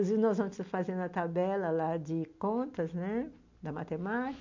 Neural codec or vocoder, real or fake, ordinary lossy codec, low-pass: none; real; MP3, 64 kbps; 7.2 kHz